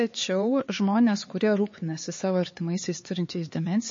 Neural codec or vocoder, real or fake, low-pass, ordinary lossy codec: codec, 16 kHz, 4 kbps, X-Codec, HuBERT features, trained on LibriSpeech; fake; 7.2 kHz; MP3, 32 kbps